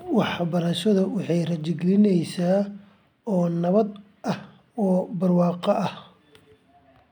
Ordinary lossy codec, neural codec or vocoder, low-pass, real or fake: none; none; 19.8 kHz; real